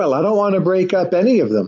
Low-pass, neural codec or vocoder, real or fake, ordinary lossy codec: 7.2 kHz; none; real; AAC, 48 kbps